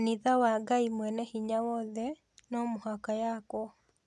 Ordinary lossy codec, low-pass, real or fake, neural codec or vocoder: none; none; real; none